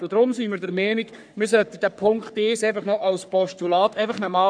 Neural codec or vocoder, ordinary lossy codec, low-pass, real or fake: codec, 44.1 kHz, 3.4 kbps, Pupu-Codec; none; 9.9 kHz; fake